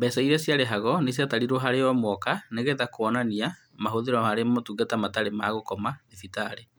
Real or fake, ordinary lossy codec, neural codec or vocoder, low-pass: real; none; none; none